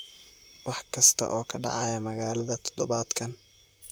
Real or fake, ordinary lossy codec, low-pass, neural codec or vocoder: fake; none; none; vocoder, 44.1 kHz, 128 mel bands, Pupu-Vocoder